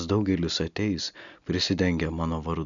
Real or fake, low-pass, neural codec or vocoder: real; 7.2 kHz; none